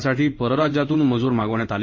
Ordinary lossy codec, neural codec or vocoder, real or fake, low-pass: MP3, 32 kbps; codec, 16 kHz in and 24 kHz out, 1 kbps, XY-Tokenizer; fake; 7.2 kHz